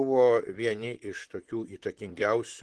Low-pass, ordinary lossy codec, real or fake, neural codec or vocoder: 10.8 kHz; Opus, 16 kbps; fake; vocoder, 44.1 kHz, 128 mel bands, Pupu-Vocoder